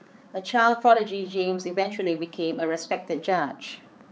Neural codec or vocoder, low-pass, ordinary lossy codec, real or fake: codec, 16 kHz, 4 kbps, X-Codec, HuBERT features, trained on balanced general audio; none; none; fake